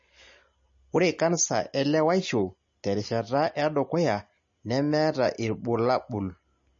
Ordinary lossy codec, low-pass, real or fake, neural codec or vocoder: MP3, 32 kbps; 7.2 kHz; real; none